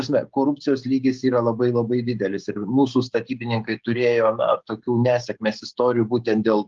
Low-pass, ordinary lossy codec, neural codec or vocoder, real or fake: 7.2 kHz; Opus, 32 kbps; codec, 16 kHz, 16 kbps, FreqCodec, smaller model; fake